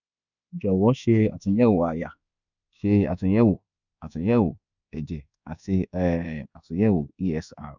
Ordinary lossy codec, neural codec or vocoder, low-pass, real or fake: none; codec, 24 kHz, 1.2 kbps, DualCodec; 7.2 kHz; fake